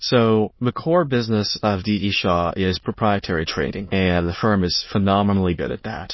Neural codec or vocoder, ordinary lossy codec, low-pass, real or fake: autoencoder, 22.05 kHz, a latent of 192 numbers a frame, VITS, trained on many speakers; MP3, 24 kbps; 7.2 kHz; fake